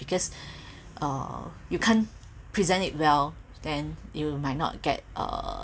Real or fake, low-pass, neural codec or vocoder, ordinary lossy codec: real; none; none; none